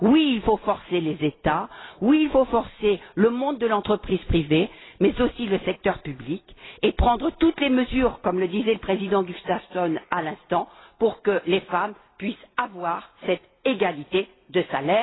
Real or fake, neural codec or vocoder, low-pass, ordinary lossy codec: real; none; 7.2 kHz; AAC, 16 kbps